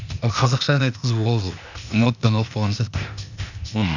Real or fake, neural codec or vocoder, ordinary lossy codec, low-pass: fake; codec, 16 kHz, 0.8 kbps, ZipCodec; none; 7.2 kHz